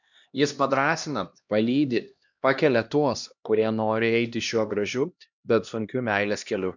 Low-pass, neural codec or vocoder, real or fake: 7.2 kHz; codec, 16 kHz, 1 kbps, X-Codec, HuBERT features, trained on LibriSpeech; fake